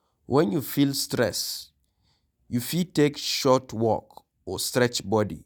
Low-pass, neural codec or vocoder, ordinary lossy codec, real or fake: none; none; none; real